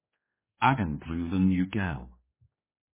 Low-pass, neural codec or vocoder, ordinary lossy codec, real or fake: 3.6 kHz; codec, 16 kHz, 2 kbps, X-Codec, HuBERT features, trained on general audio; MP3, 16 kbps; fake